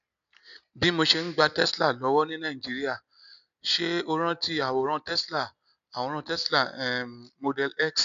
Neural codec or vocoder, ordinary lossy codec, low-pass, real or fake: none; none; 7.2 kHz; real